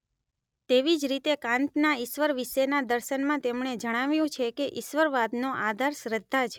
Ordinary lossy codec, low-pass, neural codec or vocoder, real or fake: none; 19.8 kHz; none; real